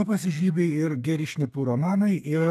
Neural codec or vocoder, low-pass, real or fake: codec, 32 kHz, 1.9 kbps, SNAC; 14.4 kHz; fake